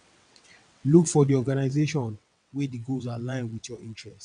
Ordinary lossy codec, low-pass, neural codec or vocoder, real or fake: MP3, 96 kbps; 9.9 kHz; vocoder, 22.05 kHz, 80 mel bands, WaveNeXt; fake